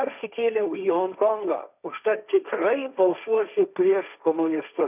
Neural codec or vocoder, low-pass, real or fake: codec, 16 kHz, 1.1 kbps, Voila-Tokenizer; 3.6 kHz; fake